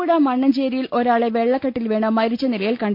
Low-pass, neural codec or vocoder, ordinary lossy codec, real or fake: 5.4 kHz; none; none; real